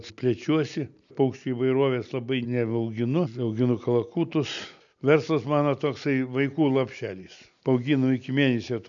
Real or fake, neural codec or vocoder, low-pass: real; none; 7.2 kHz